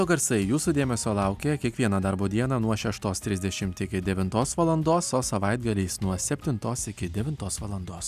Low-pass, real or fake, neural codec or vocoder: 14.4 kHz; real; none